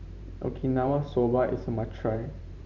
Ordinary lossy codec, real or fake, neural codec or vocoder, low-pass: none; fake; vocoder, 44.1 kHz, 128 mel bands every 512 samples, BigVGAN v2; 7.2 kHz